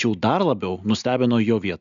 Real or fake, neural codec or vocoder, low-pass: real; none; 7.2 kHz